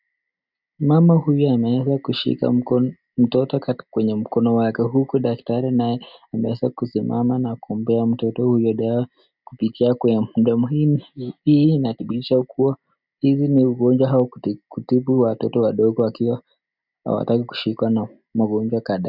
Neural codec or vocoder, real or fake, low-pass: none; real; 5.4 kHz